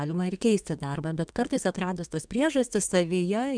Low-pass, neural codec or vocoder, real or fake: 9.9 kHz; codec, 32 kHz, 1.9 kbps, SNAC; fake